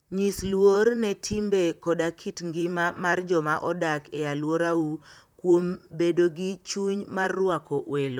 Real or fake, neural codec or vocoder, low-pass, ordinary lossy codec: fake; vocoder, 44.1 kHz, 128 mel bands, Pupu-Vocoder; 19.8 kHz; none